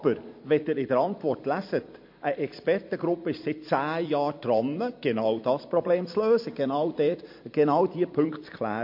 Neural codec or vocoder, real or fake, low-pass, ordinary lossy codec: vocoder, 44.1 kHz, 128 mel bands every 256 samples, BigVGAN v2; fake; 5.4 kHz; MP3, 32 kbps